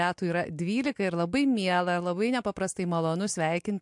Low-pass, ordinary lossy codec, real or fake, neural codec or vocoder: 10.8 kHz; MP3, 64 kbps; real; none